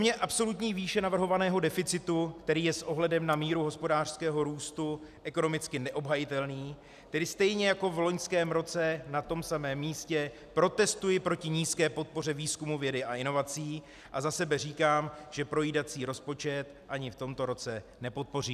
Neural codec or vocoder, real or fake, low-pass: none; real; 14.4 kHz